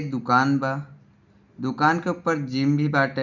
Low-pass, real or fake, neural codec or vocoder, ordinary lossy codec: 7.2 kHz; real; none; none